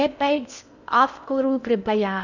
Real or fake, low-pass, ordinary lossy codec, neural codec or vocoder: fake; 7.2 kHz; none; codec, 16 kHz in and 24 kHz out, 0.6 kbps, FocalCodec, streaming, 4096 codes